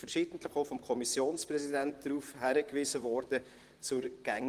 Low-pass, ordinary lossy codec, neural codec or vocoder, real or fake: 14.4 kHz; Opus, 24 kbps; vocoder, 44.1 kHz, 128 mel bands, Pupu-Vocoder; fake